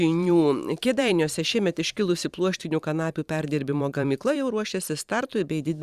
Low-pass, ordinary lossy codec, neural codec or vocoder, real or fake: 14.4 kHz; Opus, 64 kbps; vocoder, 44.1 kHz, 128 mel bands every 512 samples, BigVGAN v2; fake